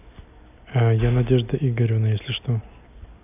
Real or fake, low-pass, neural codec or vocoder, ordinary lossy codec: real; 3.6 kHz; none; none